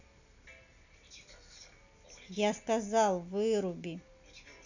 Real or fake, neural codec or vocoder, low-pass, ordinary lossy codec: real; none; 7.2 kHz; none